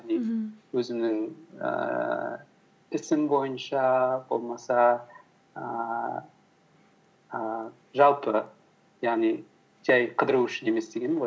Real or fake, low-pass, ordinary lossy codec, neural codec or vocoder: real; none; none; none